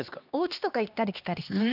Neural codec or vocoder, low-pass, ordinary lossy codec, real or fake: codec, 16 kHz, 2 kbps, X-Codec, HuBERT features, trained on LibriSpeech; 5.4 kHz; none; fake